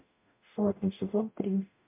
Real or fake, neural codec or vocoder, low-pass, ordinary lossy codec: fake; codec, 44.1 kHz, 0.9 kbps, DAC; 3.6 kHz; MP3, 32 kbps